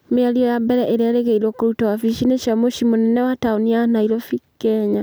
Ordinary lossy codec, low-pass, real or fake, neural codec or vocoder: none; none; real; none